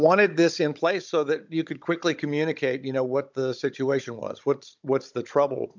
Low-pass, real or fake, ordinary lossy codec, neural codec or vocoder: 7.2 kHz; fake; MP3, 64 kbps; codec, 16 kHz, 16 kbps, FunCodec, trained on Chinese and English, 50 frames a second